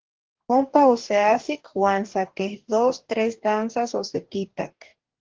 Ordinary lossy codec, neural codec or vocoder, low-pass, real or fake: Opus, 16 kbps; codec, 44.1 kHz, 2.6 kbps, DAC; 7.2 kHz; fake